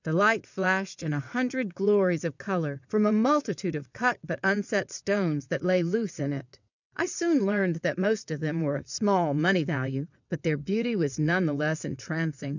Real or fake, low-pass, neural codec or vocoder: fake; 7.2 kHz; vocoder, 22.05 kHz, 80 mel bands, WaveNeXt